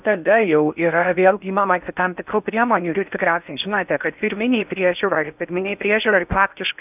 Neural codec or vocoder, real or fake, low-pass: codec, 16 kHz in and 24 kHz out, 0.6 kbps, FocalCodec, streaming, 4096 codes; fake; 3.6 kHz